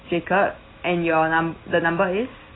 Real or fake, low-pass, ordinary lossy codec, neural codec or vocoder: real; 7.2 kHz; AAC, 16 kbps; none